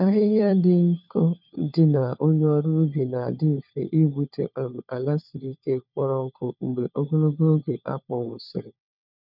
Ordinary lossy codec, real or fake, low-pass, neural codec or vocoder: none; fake; 5.4 kHz; codec, 16 kHz, 4 kbps, FunCodec, trained on LibriTTS, 50 frames a second